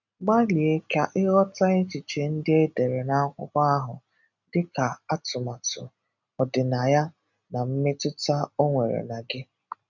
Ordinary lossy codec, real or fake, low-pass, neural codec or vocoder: none; real; 7.2 kHz; none